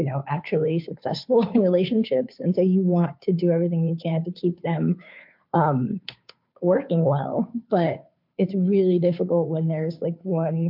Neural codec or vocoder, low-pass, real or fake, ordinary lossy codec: codec, 16 kHz, 2 kbps, FunCodec, trained on Chinese and English, 25 frames a second; 5.4 kHz; fake; MP3, 48 kbps